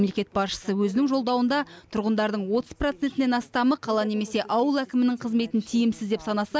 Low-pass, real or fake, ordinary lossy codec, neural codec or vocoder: none; real; none; none